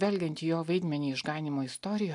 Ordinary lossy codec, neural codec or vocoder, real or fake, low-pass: AAC, 64 kbps; none; real; 10.8 kHz